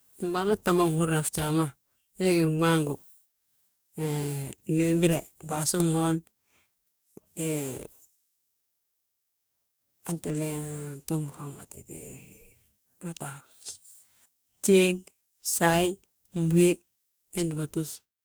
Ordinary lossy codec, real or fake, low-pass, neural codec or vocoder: none; fake; none; codec, 44.1 kHz, 2.6 kbps, DAC